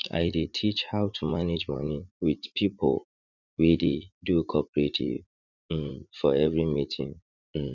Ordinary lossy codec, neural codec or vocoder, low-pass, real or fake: none; vocoder, 44.1 kHz, 80 mel bands, Vocos; 7.2 kHz; fake